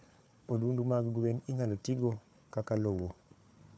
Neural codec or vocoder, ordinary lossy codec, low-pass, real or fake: codec, 16 kHz, 4 kbps, FunCodec, trained on Chinese and English, 50 frames a second; none; none; fake